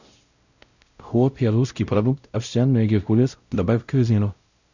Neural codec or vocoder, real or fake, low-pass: codec, 16 kHz, 0.5 kbps, X-Codec, WavLM features, trained on Multilingual LibriSpeech; fake; 7.2 kHz